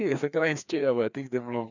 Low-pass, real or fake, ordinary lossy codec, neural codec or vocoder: 7.2 kHz; fake; none; codec, 16 kHz, 2 kbps, FreqCodec, larger model